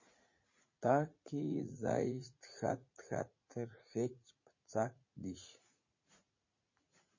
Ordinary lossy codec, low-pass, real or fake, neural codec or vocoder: MP3, 32 kbps; 7.2 kHz; fake; vocoder, 44.1 kHz, 80 mel bands, Vocos